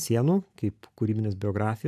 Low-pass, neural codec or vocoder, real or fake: 14.4 kHz; none; real